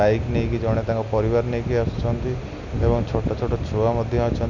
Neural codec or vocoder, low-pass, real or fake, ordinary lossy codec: none; 7.2 kHz; real; none